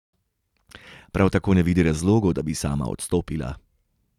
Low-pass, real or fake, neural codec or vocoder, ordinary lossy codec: 19.8 kHz; real; none; none